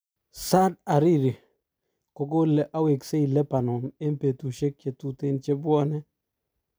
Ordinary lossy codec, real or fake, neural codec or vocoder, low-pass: none; real; none; none